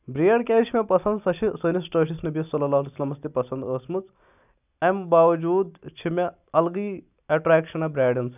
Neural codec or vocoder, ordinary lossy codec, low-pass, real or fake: none; none; 3.6 kHz; real